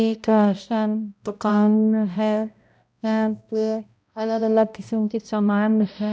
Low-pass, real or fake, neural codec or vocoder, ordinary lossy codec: none; fake; codec, 16 kHz, 0.5 kbps, X-Codec, HuBERT features, trained on balanced general audio; none